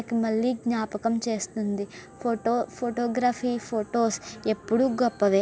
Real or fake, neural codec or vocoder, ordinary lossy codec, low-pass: real; none; none; none